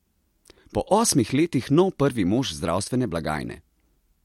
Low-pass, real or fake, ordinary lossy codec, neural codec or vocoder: 19.8 kHz; real; MP3, 64 kbps; none